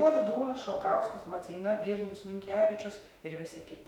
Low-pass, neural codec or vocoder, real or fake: 19.8 kHz; autoencoder, 48 kHz, 32 numbers a frame, DAC-VAE, trained on Japanese speech; fake